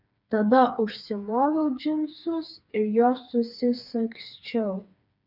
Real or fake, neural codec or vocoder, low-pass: fake; codec, 16 kHz, 4 kbps, FreqCodec, smaller model; 5.4 kHz